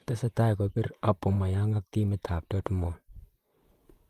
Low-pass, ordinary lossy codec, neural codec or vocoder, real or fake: 14.4 kHz; Opus, 32 kbps; vocoder, 44.1 kHz, 128 mel bands, Pupu-Vocoder; fake